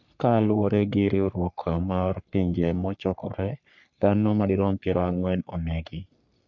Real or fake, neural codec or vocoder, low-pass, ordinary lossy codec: fake; codec, 44.1 kHz, 3.4 kbps, Pupu-Codec; 7.2 kHz; none